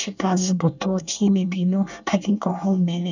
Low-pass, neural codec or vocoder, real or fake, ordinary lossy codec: 7.2 kHz; codec, 24 kHz, 1 kbps, SNAC; fake; none